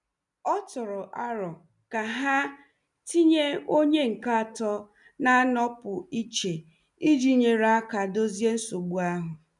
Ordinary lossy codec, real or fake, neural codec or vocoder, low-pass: none; real; none; 10.8 kHz